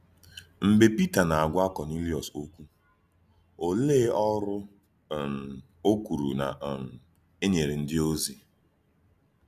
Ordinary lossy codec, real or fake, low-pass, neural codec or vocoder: none; real; 14.4 kHz; none